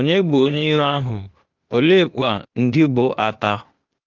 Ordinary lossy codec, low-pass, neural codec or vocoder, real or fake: Opus, 32 kbps; 7.2 kHz; codec, 16 kHz, 0.8 kbps, ZipCodec; fake